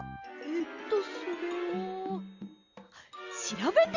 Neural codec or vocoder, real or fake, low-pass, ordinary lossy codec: none; real; 7.2 kHz; Opus, 64 kbps